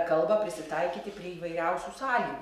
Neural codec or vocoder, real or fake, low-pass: none; real; 14.4 kHz